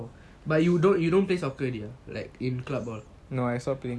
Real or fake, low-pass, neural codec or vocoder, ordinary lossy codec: real; none; none; none